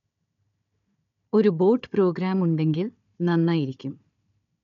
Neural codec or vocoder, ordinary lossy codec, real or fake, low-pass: codec, 16 kHz, 4 kbps, FunCodec, trained on Chinese and English, 50 frames a second; none; fake; 7.2 kHz